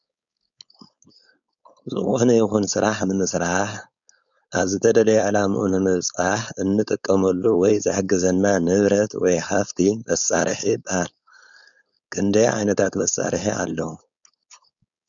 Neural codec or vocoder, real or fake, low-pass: codec, 16 kHz, 4.8 kbps, FACodec; fake; 7.2 kHz